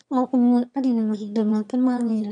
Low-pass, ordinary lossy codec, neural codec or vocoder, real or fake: 9.9 kHz; none; autoencoder, 22.05 kHz, a latent of 192 numbers a frame, VITS, trained on one speaker; fake